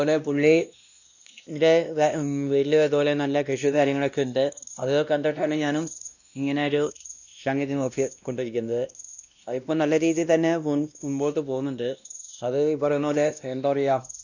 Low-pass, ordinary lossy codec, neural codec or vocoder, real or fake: 7.2 kHz; none; codec, 16 kHz, 1 kbps, X-Codec, WavLM features, trained on Multilingual LibriSpeech; fake